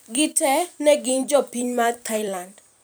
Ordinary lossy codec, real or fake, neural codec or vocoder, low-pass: none; fake; vocoder, 44.1 kHz, 128 mel bands every 256 samples, BigVGAN v2; none